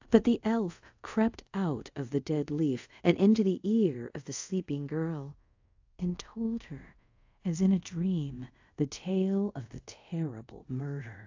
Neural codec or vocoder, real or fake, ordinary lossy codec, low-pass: codec, 24 kHz, 0.5 kbps, DualCodec; fake; AAC, 48 kbps; 7.2 kHz